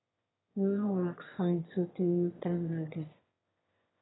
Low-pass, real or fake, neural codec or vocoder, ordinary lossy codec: 7.2 kHz; fake; autoencoder, 22.05 kHz, a latent of 192 numbers a frame, VITS, trained on one speaker; AAC, 16 kbps